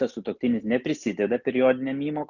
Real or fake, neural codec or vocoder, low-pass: real; none; 7.2 kHz